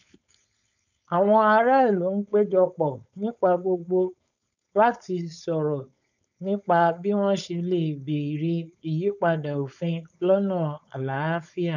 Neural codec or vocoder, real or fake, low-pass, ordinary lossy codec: codec, 16 kHz, 4.8 kbps, FACodec; fake; 7.2 kHz; none